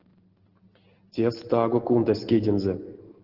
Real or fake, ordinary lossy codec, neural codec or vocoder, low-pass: real; Opus, 16 kbps; none; 5.4 kHz